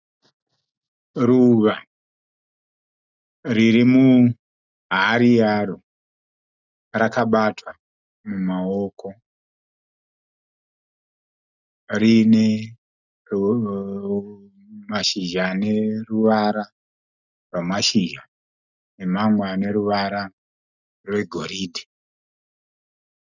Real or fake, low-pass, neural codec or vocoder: real; 7.2 kHz; none